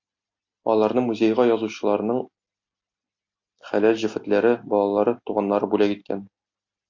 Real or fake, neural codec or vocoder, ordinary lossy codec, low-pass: real; none; MP3, 48 kbps; 7.2 kHz